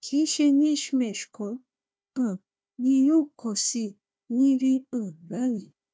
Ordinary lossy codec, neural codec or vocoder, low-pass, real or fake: none; codec, 16 kHz, 1 kbps, FunCodec, trained on Chinese and English, 50 frames a second; none; fake